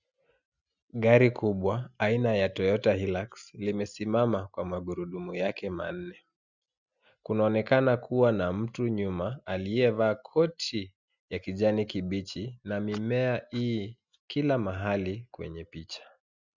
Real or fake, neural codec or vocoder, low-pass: real; none; 7.2 kHz